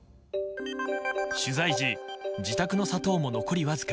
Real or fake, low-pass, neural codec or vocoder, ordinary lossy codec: real; none; none; none